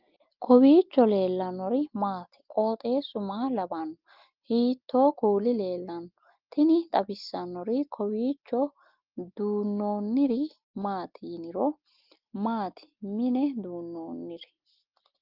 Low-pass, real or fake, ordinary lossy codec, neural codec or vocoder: 5.4 kHz; real; Opus, 32 kbps; none